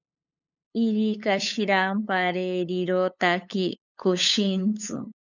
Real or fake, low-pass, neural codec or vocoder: fake; 7.2 kHz; codec, 16 kHz, 8 kbps, FunCodec, trained on LibriTTS, 25 frames a second